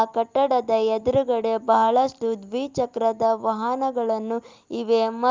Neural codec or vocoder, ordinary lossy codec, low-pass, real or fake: none; Opus, 24 kbps; 7.2 kHz; real